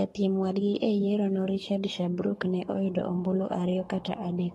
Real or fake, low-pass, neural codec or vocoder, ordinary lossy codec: fake; 19.8 kHz; codec, 44.1 kHz, 7.8 kbps, Pupu-Codec; AAC, 32 kbps